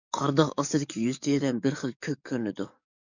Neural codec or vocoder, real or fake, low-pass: codec, 44.1 kHz, 7.8 kbps, DAC; fake; 7.2 kHz